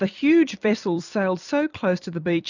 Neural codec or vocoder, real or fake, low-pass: vocoder, 44.1 kHz, 128 mel bands every 256 samples, BigVGAN v2; fake; 7.2 kHz